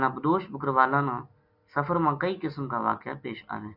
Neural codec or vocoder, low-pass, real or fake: none; 5.4 kHz; real